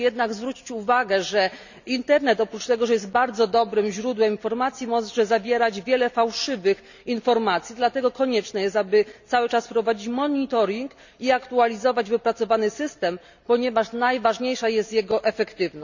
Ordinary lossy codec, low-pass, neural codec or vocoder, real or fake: none; 7.2 kHz; none; real